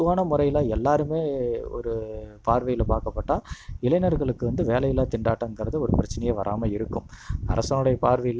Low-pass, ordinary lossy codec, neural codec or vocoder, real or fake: none; none; none; real